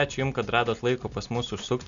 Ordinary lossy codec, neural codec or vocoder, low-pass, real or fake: MP3, 96 kbps; none; 7.2 kHz; real